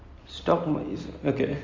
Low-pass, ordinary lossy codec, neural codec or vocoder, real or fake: 7.2 kHz; Opus, 32 kbps; none; real